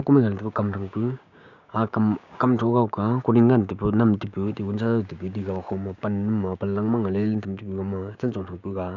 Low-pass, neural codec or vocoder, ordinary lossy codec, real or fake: 7.2 kHz; codec, 16 kHz, 6 kbps, DAC; none; fake